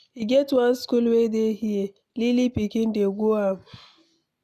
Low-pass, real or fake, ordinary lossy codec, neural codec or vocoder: 14.4 kHz; real; none; none